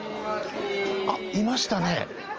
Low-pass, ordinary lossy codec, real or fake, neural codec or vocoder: 7.2 kHz; Opus, 24 kbps; real; none